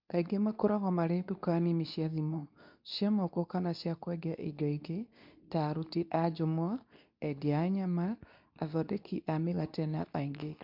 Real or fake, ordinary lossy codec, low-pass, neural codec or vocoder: fake; MP3, 48 kbps; 5.4 kHz; codec, 24 kHz, 0.9 kbps, WavTokenizer, medium speech release version 2